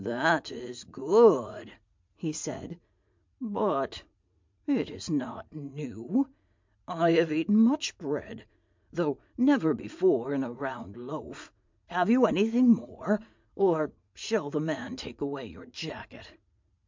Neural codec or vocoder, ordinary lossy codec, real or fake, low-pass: vocoder, 44.1 kHz, 80 mel bands, Vocos; MP3, 64 kbps; fake; 7.2 kHz